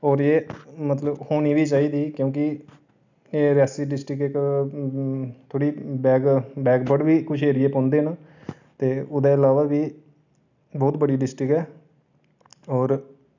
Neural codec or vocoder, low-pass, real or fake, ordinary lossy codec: none; 7.2 kHz; real; none